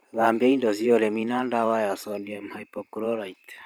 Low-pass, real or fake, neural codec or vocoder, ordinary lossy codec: none; fake; vocoder, 44.1 kHz, 128 mel bands every 512 samples, BigVGAN v2; none